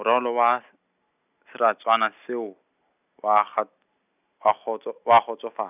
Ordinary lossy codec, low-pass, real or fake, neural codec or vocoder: none; 3.6 kHz; real; none